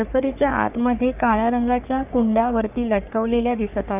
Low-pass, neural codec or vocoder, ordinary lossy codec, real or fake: 3.6 kHz; codec, 44.1 kHz, 3.4 kbps, Pupu-Codec; none; fake